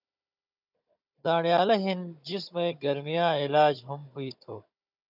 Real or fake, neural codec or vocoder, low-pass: fake; codec, 16 kHz, 16 kbps, FunCodec, trained on Chinese and English, 50 frames a second; 5.4 kHz